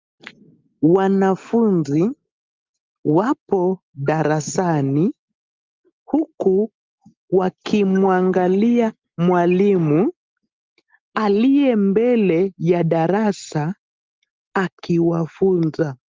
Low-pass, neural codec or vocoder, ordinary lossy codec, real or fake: 7.2 kHz; none; Opus, 32 kbps; real